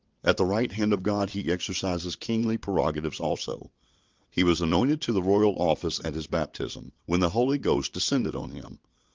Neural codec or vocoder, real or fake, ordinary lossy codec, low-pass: vocoder, 22.05 kHz, 80 mel bands, WaveNeXt; fake; Opus, 32 kbps; 7.2 kHz